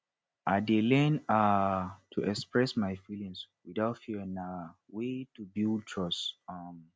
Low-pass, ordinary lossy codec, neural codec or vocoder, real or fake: none; none; none; real